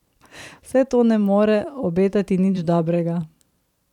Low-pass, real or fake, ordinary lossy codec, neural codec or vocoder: 19.8 kHz; fake; none; vocoder, 44.1 kHz, 128 mel bands every 512 samples, BigVGAN v2